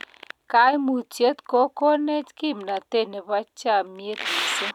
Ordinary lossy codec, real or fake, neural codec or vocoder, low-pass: none; real; none; 19.8 kHz